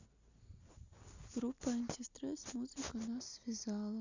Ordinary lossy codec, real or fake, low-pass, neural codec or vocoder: Opus, 64 kbps; real; 7.2 kHz; none